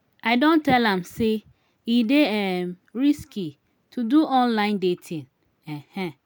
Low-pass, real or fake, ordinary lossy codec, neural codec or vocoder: none; real; none; none